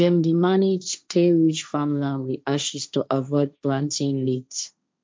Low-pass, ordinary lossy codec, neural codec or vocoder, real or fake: none; none; codec, 16 kHz, 1.1 kbps, Voila-Tokenizer; fake